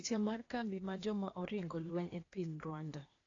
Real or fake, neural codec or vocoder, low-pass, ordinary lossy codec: fake; codec, 16 kHz, 0.8 kbps, ZipCodec; 7.2 kHz; AAC, 32 kbps